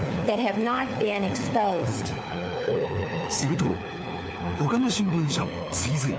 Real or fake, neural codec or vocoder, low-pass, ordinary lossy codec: fake; codec, 16 kHz, 4 kbps, FunCodec, trained on LibriTTS, 50 frames a second; none; none